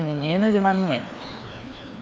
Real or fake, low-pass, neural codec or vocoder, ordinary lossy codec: fake; none; codec, 16 kHz, 2 kbps, FreqCodec, larger model; none